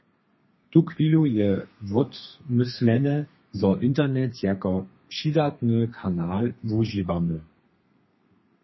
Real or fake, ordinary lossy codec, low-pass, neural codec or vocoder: fake; MP3, 24 kbps; 7.2 kHz; codec, 32 kHz, 1.9 kbps, SNAC